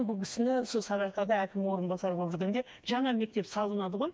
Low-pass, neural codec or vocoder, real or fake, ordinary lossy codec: none; codec, 16 kHz, 2 kbps, FreqCodec, smaller model; fake; none